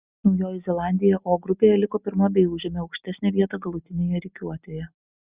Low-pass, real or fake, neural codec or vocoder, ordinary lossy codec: 3.6 kHz; real; none; Opus, 64 kbps